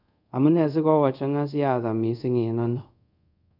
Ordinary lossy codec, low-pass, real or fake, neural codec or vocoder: none; 5.4 kHz; fake; codec, 24 kHz, 0.5 kbps, DualCodec